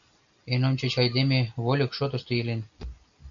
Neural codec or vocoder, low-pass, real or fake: none; 7.2 kHz; real